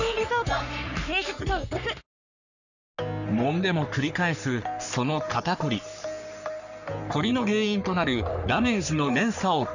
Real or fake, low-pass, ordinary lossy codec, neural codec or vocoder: fake; 7.2 kHz; none; codec, 44.1 kHz, 3.4 kbps, Pupu-Codec